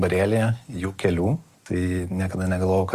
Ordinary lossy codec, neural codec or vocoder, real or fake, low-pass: Opus, 32 kbps; none; real; 14.4 kHz